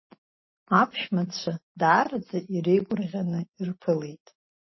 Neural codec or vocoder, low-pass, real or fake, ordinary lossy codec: none; 7.2 kHz; real; MP3, 24 kbps